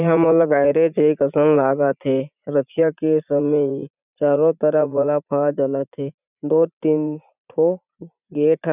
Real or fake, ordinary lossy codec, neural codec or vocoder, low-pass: fake; none; vocoder, 44.1 kHz, 80 mel bands, Vocos; 3.6 kHz